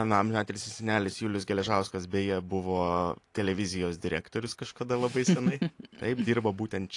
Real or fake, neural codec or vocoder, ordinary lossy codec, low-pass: real; none; AAC, 48 kbps; 10.8 kHz